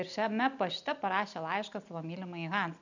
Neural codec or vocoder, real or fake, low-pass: none; real; 7.2 kHz